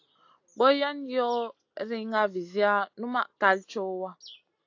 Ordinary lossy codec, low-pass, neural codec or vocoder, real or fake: AAC, 48 kbps; 7.2 kHz; none; real